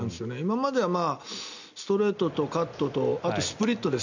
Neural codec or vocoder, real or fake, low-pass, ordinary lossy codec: none; real; 7.2 kHz; none